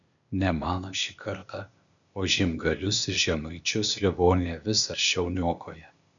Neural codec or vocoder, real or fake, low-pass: codec, 16 kHz, 0.8 kbps, ZipCodec; fake; 7.2 kHz